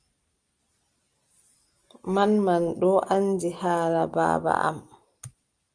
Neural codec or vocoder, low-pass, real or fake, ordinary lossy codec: none; 9.9 kHz; real; Opus, 32 kbps